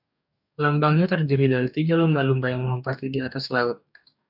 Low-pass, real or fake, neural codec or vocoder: 5.4 kHz; fake; codec, 44.1 kHz, 2.6 kbps, DAC